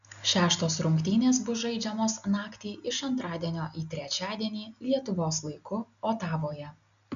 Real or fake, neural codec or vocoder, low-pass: real; none; 7.2 kHz